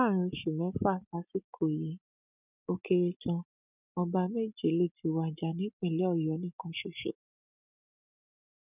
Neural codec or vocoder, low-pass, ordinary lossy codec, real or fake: none; 3.6 kHz; none; real